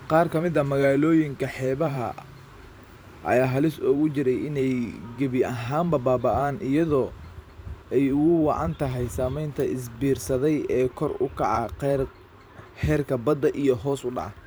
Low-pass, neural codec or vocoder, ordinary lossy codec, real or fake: none; vocoder, 44.1 kHz, 128 mel bands every 512 samples, BigVGAN v2; none; fake